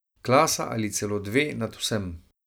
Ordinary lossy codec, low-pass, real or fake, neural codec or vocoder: none; none; real; none